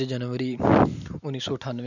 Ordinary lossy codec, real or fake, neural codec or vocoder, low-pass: none; real; none; 7.2 kHz